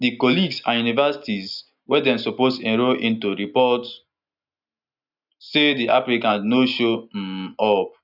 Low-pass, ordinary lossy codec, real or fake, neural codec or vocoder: 5.4 kHz; none; real; none